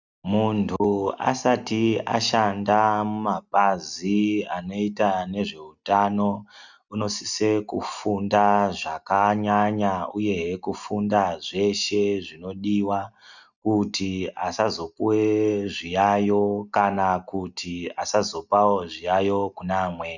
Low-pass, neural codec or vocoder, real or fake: 7.2 kHz; none; real